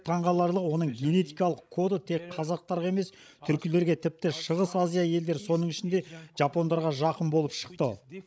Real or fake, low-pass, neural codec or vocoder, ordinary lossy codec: fake; none; codec, 16 kHz, 16 kbps, FreqCodec, larger model; none